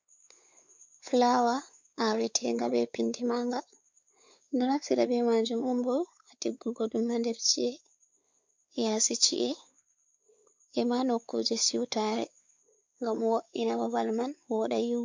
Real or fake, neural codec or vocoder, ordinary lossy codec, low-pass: fake; codec, 16 kHz, 4 kbps, X-Codec, WavLM features, trained on Multilingual LibriSpeech; MP3, 64 kbps; 7.2 kHz